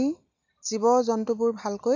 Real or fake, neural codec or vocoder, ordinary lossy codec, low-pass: real; none; none; 7.2 kHz